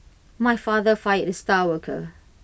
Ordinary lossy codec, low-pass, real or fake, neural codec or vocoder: none; none; real; none